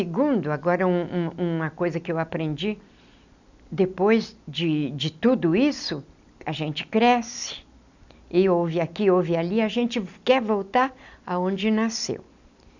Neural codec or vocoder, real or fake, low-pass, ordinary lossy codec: none; real; 7.2 kHz; none